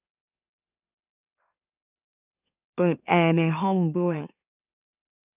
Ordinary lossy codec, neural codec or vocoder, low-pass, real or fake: none; autoencoder, 44.1 kHz, a latent of 192 numbers a frame, MeloTTS; 3.6 kHz; fake